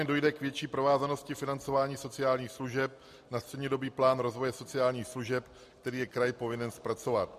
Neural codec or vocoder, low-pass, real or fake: none; 14.4 kHz; real